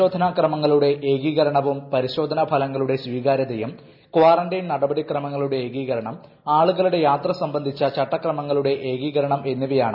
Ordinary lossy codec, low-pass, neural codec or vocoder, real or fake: none; 5.4 kHz; none; real